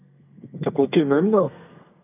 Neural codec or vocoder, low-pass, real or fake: codec, 32 kHz, 1.9 kbps, SNAC; 3.6 kHz; fake